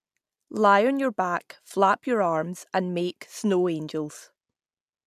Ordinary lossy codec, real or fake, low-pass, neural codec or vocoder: none; real; 14.4 kHz; none